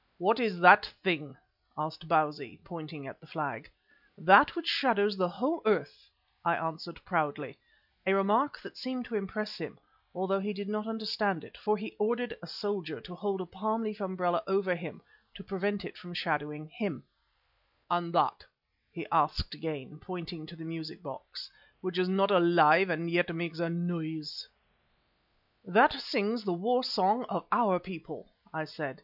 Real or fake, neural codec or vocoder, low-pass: real; none; 5.4 kHz